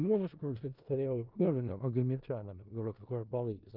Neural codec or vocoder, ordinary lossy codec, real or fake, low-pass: codec, 16 kHz in and 24 kHz out, 0.4 kbps, LongCat-Audio-Codec, four codebook decoder; Opus, 24 kbps; fake; 5.4 kHz